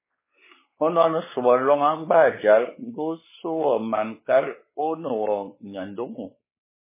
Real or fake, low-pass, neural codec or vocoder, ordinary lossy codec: fake; 3.6 kHz; codec, 16 kHz, 4 kbps, X-Codec, WavLM features, trained on Multilingual LibriSpeech; MP3, 16 kbps